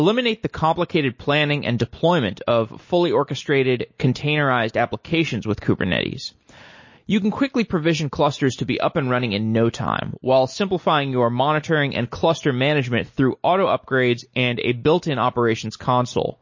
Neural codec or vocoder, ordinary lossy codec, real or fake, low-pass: none; MP3, 32 kbps; real; 7.2 kHz